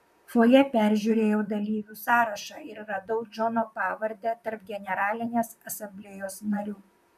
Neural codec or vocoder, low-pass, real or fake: vocoder, 44.1 kHz, 128 mel bands, Pupu-Vocoder; 14.4 kHz; fake